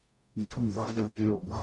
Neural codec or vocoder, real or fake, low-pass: codec, 44.1 kHz, 0.9 kbps, DAC; fake; 10.8 kHz